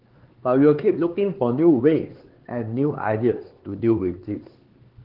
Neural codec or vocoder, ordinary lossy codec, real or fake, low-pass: codec, 16 kHz, 4 kbps, X-Codec, HuBERT features, trained on LibriSpeech; Opus, 16 kbps; fake; 5.4 kHz